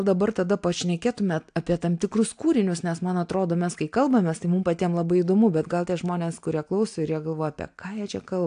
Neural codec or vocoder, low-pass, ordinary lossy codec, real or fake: none; 9.9 kHz; AAC, 64 kbps; real